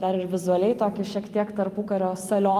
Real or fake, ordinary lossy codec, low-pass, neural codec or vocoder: fake; Opus, 32 kbps; 14.4 kHz; vocoder, 44.1 kHz, 128 mel bands every 256 samples, BigVGAN v2